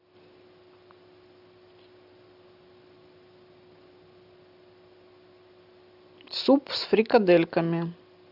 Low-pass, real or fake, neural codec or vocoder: 5.4 kHz; real; none